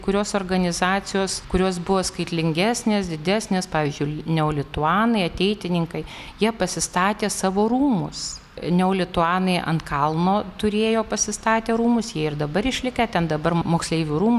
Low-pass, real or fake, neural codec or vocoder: 14.4 kHz; real; none